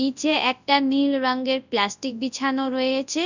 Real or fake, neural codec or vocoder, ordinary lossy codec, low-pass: fake; codec, 24 kHz, 0.9 kbps, WavTokenizer, large speech release; none; 7.2 kHz